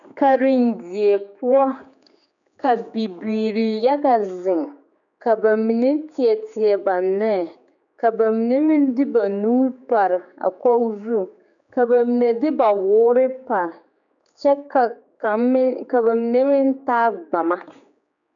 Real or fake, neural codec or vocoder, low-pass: fake; codec, 16 kHz, 4 kbps, X-Codec, HuBERT features, trained on general audio; 7.2 kHz